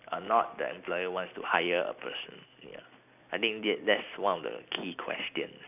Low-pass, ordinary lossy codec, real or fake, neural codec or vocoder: 3.6 kHz; none; real; none